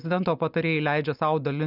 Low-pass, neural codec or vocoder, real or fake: 5.4 kHz; none; real